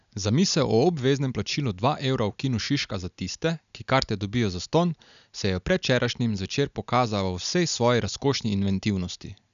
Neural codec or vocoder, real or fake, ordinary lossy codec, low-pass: none; real; none; 7.2 kHz